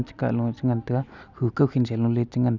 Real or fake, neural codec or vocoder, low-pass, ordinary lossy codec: real; none; 7.2 kHz; none